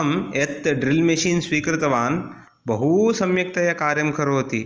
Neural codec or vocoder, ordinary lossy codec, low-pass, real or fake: none; Opus, 24 kbps; 7.2 kHz; real